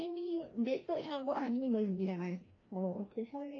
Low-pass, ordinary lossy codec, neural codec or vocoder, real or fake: 7.2 kHz; AAC, 32 kbps; codec, 16 kHz, 1 kbps, FreqCodec, larger model; fake